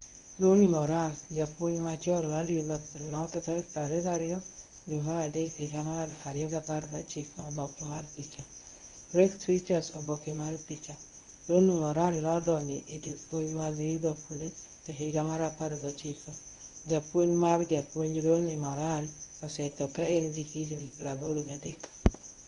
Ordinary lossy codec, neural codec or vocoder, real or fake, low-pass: none; codec, 24 kHz, 0.9 kbps, WavTokenizer, medium speech release version 1; fake; 10.8 kHz